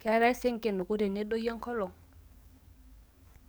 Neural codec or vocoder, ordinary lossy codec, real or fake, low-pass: codec, 44.1 kHz, 7.8 kbps, DAC; none; fake; none